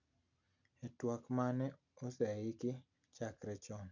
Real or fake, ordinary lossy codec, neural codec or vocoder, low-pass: real; none; none; 7.2 kHz